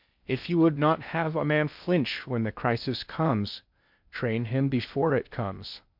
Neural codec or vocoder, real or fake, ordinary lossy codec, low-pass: codec, 16 kHz in and 24 kHz out, 0.6 kbps, FocalCodec, streaming, 4096 codes; fake; MP3, 48 kbps; 5.4 kHz